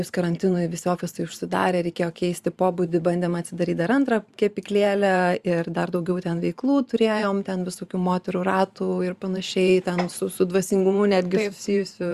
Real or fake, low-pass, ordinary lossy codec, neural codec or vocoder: fake; 14.4 kHz; Opus, 64 kbps; vocoder, 44.1 kHz, 128 mel bands every 512 samples, BigVGAN v2